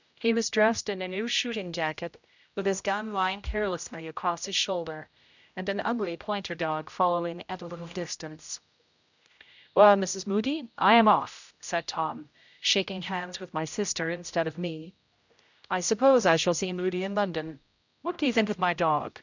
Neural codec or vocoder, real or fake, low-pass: codec, 16 kHz, 0.5 kbps, X-Codec, HuBERT features, trained on general audio; fake; 7.2 kHz